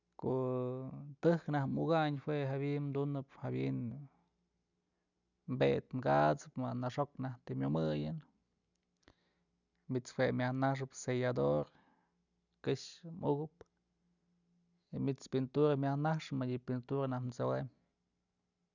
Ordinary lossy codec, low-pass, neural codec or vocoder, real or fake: none; 7.2 kHz; none; real